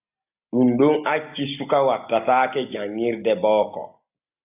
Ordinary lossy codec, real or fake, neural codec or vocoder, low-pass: AAC, 32 kbps; real; none; 3.6 kHz